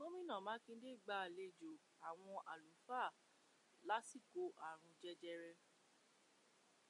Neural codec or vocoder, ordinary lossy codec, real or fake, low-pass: none; MP3, 48 kbps; real; 10.8 kHz